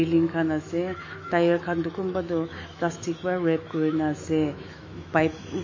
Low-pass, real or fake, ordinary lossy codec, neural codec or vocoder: 7.2 kHz; fake; MP3, 32 kbps; autoencoder, 48 kHz, 128 numbers a frame, DAC-VAE, trained on Japanese speech